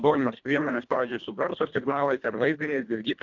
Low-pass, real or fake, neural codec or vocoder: 7.2 kHz; fake; codec, 24 kHz, 1.5 kbps, HILCodec